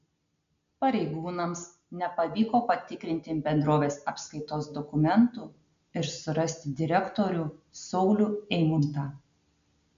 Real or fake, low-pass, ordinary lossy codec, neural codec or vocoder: real; 7.2 kHz; MP3, 64 kbps; none